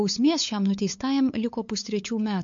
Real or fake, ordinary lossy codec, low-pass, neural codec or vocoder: fake; MP3, 48 kbps; 7.2 kHz; codec, 16 kHz, 8 kbps, FunCodec, trained on LibriTTS, 25 frames a second